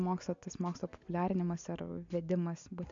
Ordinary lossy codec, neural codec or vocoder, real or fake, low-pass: AAC, 64 kbps; none; real; 7.2 kHz